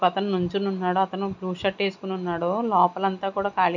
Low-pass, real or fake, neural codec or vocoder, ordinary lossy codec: 7.2 kHz; real; none; none